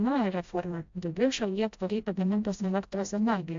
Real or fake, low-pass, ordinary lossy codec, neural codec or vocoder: fake; 7.2 kHz; AAC, 64 kbps; codec, 16 kHz, 0.5 kbps, FreqCodec, smaller model